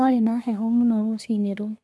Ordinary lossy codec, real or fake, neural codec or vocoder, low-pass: none; fake; codec, 24 kHz, 1 kbps, SNAC; none